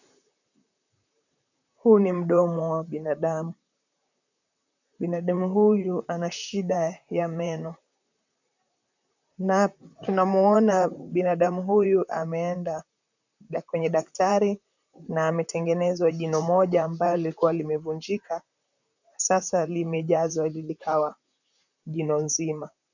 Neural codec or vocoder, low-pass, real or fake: vocoder, 44.1 kHz, 128 mel bands, Pupu-Vocoder; 7.2 kHz; fake